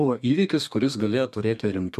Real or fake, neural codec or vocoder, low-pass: fake; codec, 32 kHz, 1.9 kbps, SNAC; 14.4 kHz